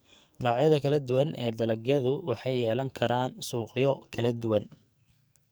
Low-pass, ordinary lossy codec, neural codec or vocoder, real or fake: none; none; codec, 44.1 kHz, 2.6 kbps, SNAC; fake